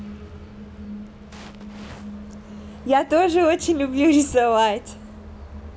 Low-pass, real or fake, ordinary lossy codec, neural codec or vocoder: none; real; none; none